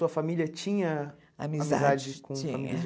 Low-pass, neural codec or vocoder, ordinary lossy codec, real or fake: none; none; none; real